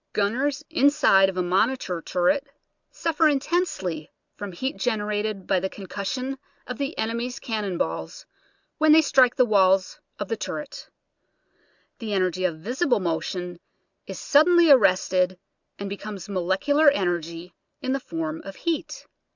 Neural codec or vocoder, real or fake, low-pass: none; real; 7.2 kHz